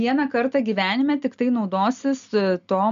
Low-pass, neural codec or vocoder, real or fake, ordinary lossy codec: 7.2 kHz; none; real; MP3, 64 kbps